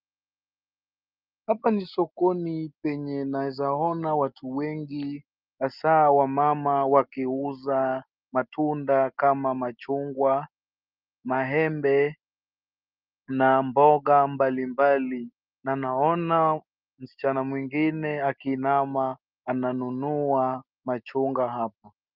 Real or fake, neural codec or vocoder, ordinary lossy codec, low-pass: real; none; Opus, 32 kbps; 5.4 kHz